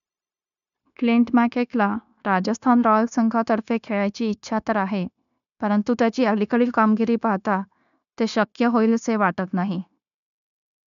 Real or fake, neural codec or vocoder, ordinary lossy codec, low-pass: fake; codec, 16 kHz, 0.9 kbps, LongCat-Audio-Codec; none; 7.2 kHz